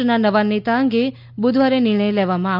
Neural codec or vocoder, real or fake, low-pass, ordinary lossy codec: none; real; 5.4 kHz; none